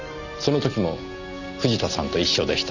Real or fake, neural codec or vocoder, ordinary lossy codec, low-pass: real; none; none; 7.2 kHz